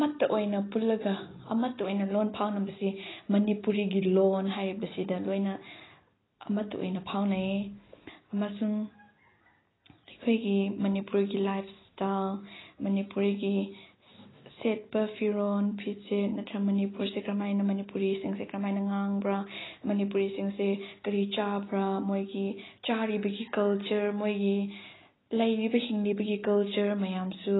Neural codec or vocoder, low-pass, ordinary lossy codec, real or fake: none; 7.2 kHz; AAC, 16 kbps; real